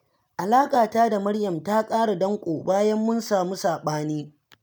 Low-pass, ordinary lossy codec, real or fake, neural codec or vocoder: none; none; real; none